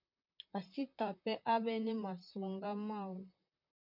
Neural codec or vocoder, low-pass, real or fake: vocoder, 44.1 kHz, 128 mel bands, Pupu-Vocoder; 5.4 kHz; fake